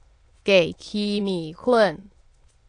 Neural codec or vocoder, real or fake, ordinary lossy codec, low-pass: autoencoder, 22.05 kHz, a latent of 192 numbers a frame, VITS, trained on many speakers; fake; AAC, 48 kbps; 9.9 kHz